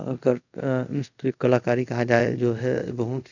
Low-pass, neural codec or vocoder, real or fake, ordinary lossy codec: 7.2 kHz; codec, 24 kHz, 0.5 kbps, DualCodec; fake; none